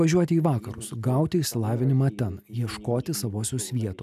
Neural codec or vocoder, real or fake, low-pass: none; real; 14.4 kHz